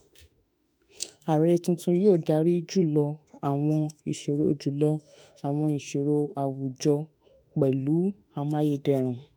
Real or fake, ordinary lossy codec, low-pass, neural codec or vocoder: fake; none; 19.8 kHz; autoencoder, 48 kHz, 32 numbers a frame, DAC-VAE, trained on Japanese speech